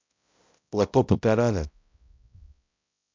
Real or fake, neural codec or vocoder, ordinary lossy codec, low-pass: fake; codec, 16 kHz, 0.5 kbps, X-Codec, HuBERT features, trained on balanced general audio; MP3, 64 kbps; 7.2 kHz